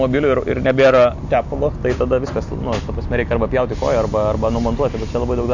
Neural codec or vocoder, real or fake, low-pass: none; real; 7.2 kHz